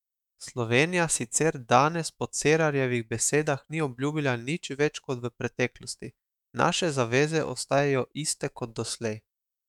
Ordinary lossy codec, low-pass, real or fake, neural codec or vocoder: none; 19.8 kHz; fake; autoencoder, 48 kHz, 128 numbers a frame, DAC-VAE, trained on Japanese speech